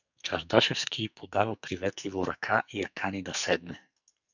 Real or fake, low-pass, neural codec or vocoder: fake; 7.2 kHz; codec, 44.1 kHz, 2.6 kbps, SNAC